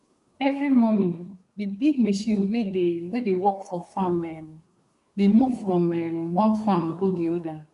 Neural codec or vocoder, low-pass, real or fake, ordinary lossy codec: codec, 24 kHz, 1 kbps, SNAC; 10.8 kHz; fake; none